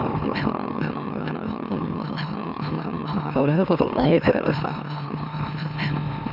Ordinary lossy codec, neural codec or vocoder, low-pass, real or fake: none; autoencoder, 44.1 kHz, a latent of 192 numbers a frame, MeloTTS; 5.4 kHz; fake